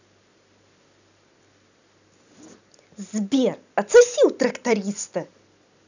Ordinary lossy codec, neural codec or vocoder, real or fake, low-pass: none; none; real; 7.2 kHz